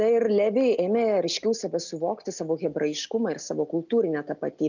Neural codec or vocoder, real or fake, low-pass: none; real; 7.2 kHz